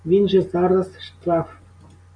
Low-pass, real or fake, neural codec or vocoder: 9.9 kHz; real; none